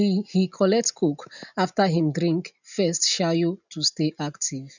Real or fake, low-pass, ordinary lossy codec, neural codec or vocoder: real; 7.2 kHz; none; none